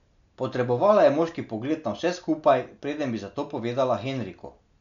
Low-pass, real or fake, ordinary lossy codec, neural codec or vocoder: 7.2 kHz; real; none; none